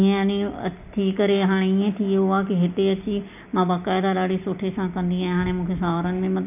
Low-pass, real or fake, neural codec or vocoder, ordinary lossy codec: 3.6 kHz; real; none; none